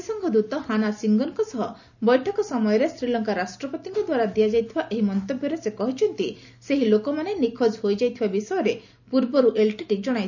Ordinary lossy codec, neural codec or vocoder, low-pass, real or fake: none; none; 7.2 kHz; real